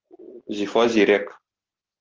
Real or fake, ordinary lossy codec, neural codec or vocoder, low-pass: real; Opus, 16 kbps; none; 7.2 kHz